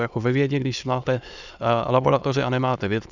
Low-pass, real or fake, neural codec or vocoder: 7.2 kHz; fake; autoencoder, 22.05 kHz, a latent of 192 numbers a frame, VITS, trained on many speakers